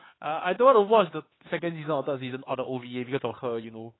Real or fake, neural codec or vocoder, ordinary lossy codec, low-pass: fake; codec, 16 kHz, 4 kbps, X-Codec, HuBERT features, trained on LibriSpeech; AAC, 16 kbps; 7.2 kHz